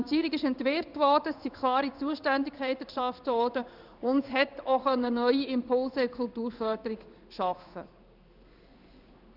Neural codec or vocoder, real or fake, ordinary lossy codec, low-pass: codec, 16 kHz in and 24 kHz out, 1 kbps, XY-Tokenizer; fake; none; 5.4 kHz